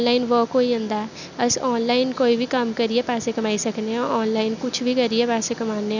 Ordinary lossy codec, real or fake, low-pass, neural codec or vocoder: none; real; 7.2 kHz; none